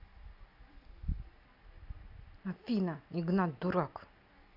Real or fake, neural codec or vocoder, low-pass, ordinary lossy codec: real; none; 5.4 kHz; none